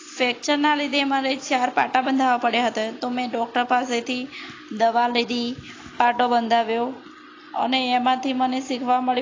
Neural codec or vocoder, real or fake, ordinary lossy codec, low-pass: none; real; AAC, 32 kbps; 7.2 kHz